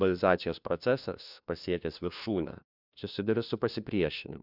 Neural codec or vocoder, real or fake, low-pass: codec, 16 kHz, 1 kbps, FunCodec, trained on LibriTTS, 50 frames a second; fake; 5.4 kHz